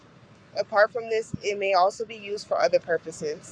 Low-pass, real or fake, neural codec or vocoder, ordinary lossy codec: 9.9 kHz; fake; codec, 44.1 kHz, 7.8 kbps, Pupu-Codec; Opus, 64 kbps